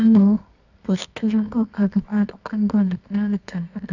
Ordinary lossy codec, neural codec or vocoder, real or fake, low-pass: none; codec, 24 kHz, 0.9 kbps, WavTokenizer, medium music audio release; fake; 7.2 kHz